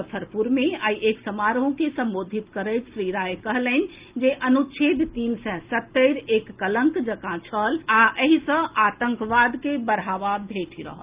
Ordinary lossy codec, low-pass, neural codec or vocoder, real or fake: Opus, 32 kbps; 3.6 kHz; none; real